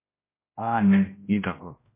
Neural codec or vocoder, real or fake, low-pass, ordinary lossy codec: codec, 16 kHz, 0.5 kbps, X-Codec, HuBERT features, trained on general audio; fake; 3.6 kHz; MP3, 24 kbps